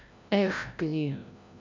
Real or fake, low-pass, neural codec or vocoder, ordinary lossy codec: fake; 7.2 kHz; codec, 16 kHz, 0.5 kbps, FreqCodec, larger model; MP3, 64 kbps